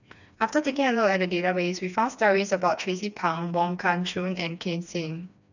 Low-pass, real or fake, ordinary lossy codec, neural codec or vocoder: 7.2 kHz; fake; none; codec, 16 kHz, 2 kbps, FreqCodec, smaller model